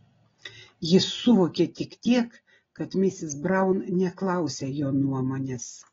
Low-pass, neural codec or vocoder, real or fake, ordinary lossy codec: 7.2 kHz; none; real; AAC, 24 kbps